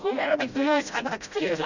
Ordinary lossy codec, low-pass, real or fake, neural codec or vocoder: none; 7.2 kHz; fake; codec, 16 kHz, 0.5 kbps, FreqCodec, smaller model